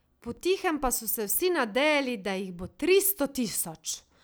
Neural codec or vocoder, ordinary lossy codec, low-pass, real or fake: none; none; none; real